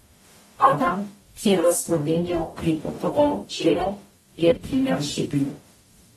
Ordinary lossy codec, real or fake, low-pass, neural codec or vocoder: AAC, 32 kbps; fake; 19.8 kHz; codec, 44.1 kHz, 0.9 kbps, DAC